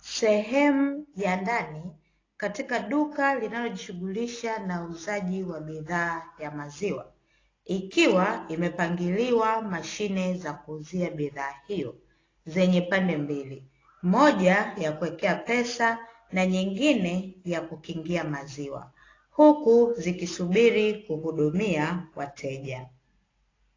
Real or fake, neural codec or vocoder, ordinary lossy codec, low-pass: real; none; AAC, 32 kbps; 7.2 kHz